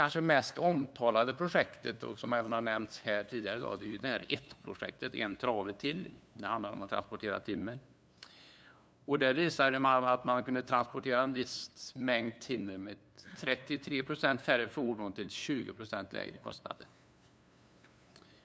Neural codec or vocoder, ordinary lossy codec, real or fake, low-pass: codec, 16 kHz, 2 kbps, FunCodec, trained on LibriTTS, 25 frames a second; none; fake; none